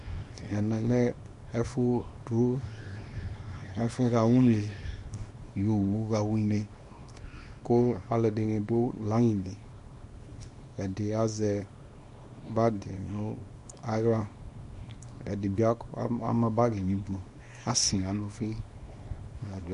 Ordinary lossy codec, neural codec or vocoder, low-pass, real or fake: MP3, 48 kbps; codec, 24 kHz, 0.9 kbps, WavTokenizer, small release; 10.8 kHz; fake